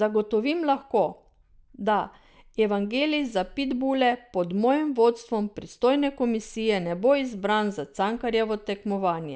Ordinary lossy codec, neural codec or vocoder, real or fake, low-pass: none; none; real; none